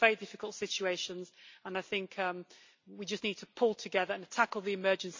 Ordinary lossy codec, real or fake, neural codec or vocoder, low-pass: MP3, 64 kbps; real; none; 7.2 kHz